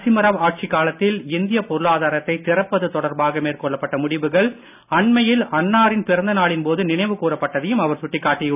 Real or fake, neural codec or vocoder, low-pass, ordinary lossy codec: real; none; 3.6 kHz; none